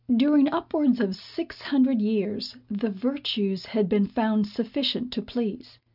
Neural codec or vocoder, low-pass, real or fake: none; 5.4 kHz; real